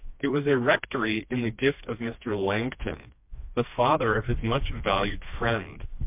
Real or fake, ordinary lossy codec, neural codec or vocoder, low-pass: fake; MP3, 32 kbps; codec, 16 kHz, 2 kbps, FreqCodec, smaller model; 3.6 kHz